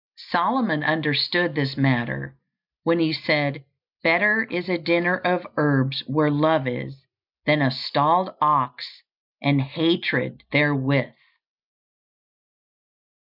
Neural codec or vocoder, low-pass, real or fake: none; 5.4 kHz; real